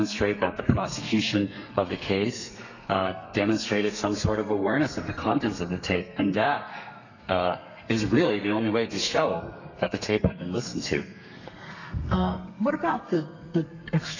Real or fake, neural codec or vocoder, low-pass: fake; codec, 32 kHz, 1.9 kbps, SNAC; 7.2 kHz